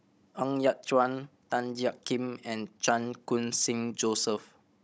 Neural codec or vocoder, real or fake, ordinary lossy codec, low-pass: codec, 16 kHz, 16 kbps, FunCodec, trained on Chinese and English, 50 frames a second; fake; none; none